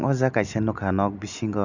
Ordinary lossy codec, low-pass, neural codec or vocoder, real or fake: none; 7.2 kHz; none; real